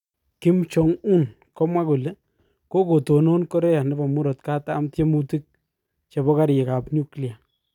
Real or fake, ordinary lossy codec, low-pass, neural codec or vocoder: real; none; 19.8 kHz; none